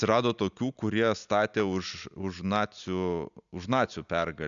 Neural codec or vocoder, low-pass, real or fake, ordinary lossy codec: none; 7.2 kHz; real; MP3, 96 kbps